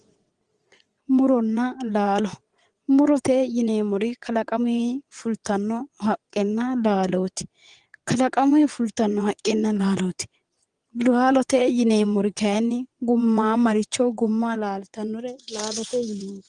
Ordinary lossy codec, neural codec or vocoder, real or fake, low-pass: Opus, 24 kbps; vocoder, 22.05 kHz, 80 mel bands, WaveNeXt; fake; 9.9 kHz